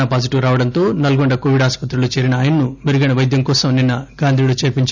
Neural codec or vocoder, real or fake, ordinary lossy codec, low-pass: none; real; none; 7.2 kHz